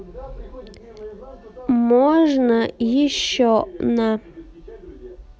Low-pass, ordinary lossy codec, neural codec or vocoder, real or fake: none; none; none; real